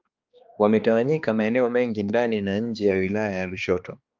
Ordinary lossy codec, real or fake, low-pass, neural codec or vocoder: Opus, 24 kbps; fake; 7.2 kHz; codec, 16 kHz, 2 kbps, X-Codec, HuBERT features, trained on balanced general audio